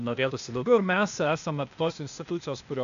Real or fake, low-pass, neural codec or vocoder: fake; 7.2 kHz; codec, 16 kHz, 0.8 kbps, ZipCodec